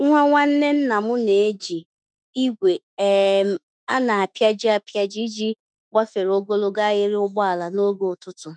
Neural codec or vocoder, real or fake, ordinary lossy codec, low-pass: autoencoder, 48 kHz, 32 numbers a frame, DAC-VAE, trained on Japanese speech; fake; none; 9.9 kHz